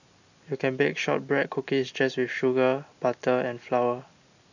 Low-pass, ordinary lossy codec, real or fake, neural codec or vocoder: 7.2 kHz; none; real; none